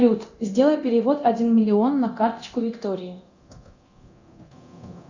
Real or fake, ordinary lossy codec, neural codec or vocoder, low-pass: fake; Opus, 64 kbps; codec, 24 kHz, 0.9 kbps, DualCodec; 7.2 kHz